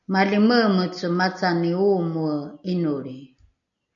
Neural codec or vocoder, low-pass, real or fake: none; 7.2 kHz; real